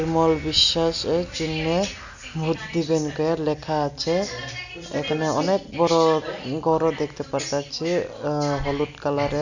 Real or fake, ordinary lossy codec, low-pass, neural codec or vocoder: real; none; 7.2 kHz; none